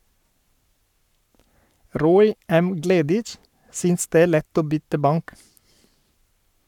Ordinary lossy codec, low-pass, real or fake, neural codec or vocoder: none; 19.8 kHz; fake; codec, 44.1 kHz, 7.8 kbps, Pupu-Codec